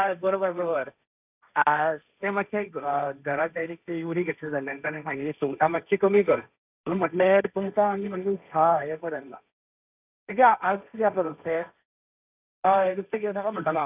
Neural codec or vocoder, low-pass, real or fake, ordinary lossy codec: codec, 16 kHz, 1.1 kbps, Voila-Tokenizer; 3.6 kHz; fake; none